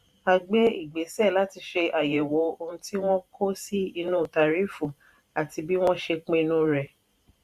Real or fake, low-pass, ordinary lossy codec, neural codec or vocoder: fake; 14.4 kHz; Opus, 64 kbps; vocoder, 44.1 kHz, 128 mel bands every 512 samples, BigVGAN v2